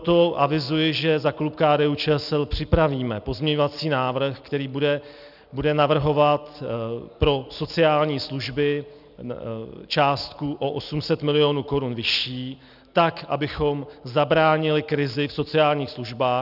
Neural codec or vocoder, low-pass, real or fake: none; 5.4 kHz; real